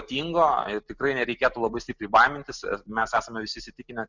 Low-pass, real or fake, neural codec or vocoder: 7.2 kHz; real; none